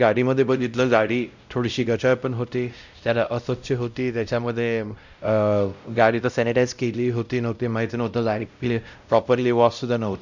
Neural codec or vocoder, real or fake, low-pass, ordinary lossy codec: codec, 16 kHz, 0.5 kbps, X-Codec, WavLM features, trained on Multilingual LibriSpeech; fake; 7.2 kHz; none